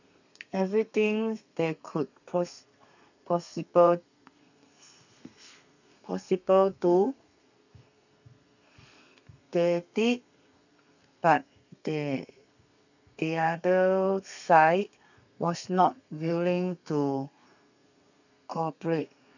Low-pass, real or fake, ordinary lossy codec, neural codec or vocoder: 7.2 kHz; fake; none; codec, 32 kHz, 1.9 kbps, SNAC